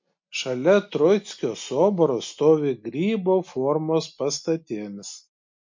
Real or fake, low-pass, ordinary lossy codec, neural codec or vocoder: real; 7.2 kHz; MP3, 48 kbps; none